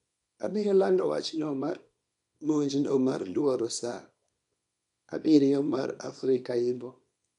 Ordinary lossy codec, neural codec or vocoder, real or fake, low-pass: none; codec, 24 kHz, 0.9 kbps, WavTokenizer, small release; fake; 10.8 kHz